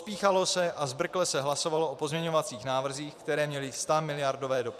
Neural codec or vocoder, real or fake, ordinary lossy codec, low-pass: vocoder, 44.1 kHz, 128 mel bands every 512 samples, BigVGAN v2; fake; AAC, 96 kbps; 14.4 kHz